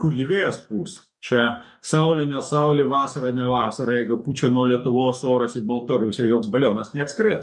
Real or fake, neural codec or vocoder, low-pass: fake; codec, 44.1 kHz, 2.6 kbps, DAC; 10.8 kHz